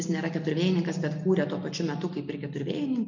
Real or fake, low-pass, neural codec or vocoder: real; 7.2 kHz; none